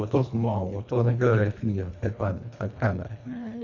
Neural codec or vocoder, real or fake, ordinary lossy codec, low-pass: codec, 24 kHz, 1.5 kbps, HILCodec; fake; none; 7.2 kHz